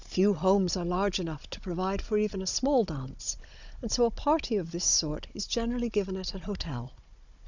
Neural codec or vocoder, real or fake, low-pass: codec, 16 kHz, 16 kbps, FunCodec, trained on Chinese and English, 50 frames a second; fake; 7.2 kHz